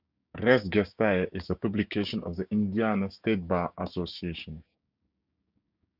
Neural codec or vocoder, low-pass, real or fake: codec, 16 kHz, 6 kbps, DAC; 5.4 kHz; fake